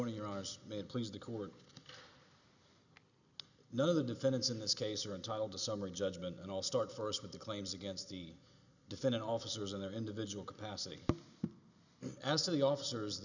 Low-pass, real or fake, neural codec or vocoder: 7.2 kHz; real; none